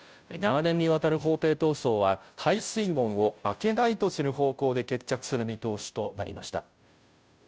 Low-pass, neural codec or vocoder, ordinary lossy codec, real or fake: none; codec, 16 kHz, 0.5 kbps, FunCodec, trained on Chinese and English, 25 frames a second; none; fake